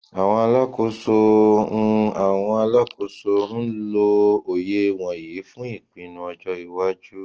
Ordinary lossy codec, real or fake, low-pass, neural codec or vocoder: Opus, 16 kbps; real; 7.2 kHz; none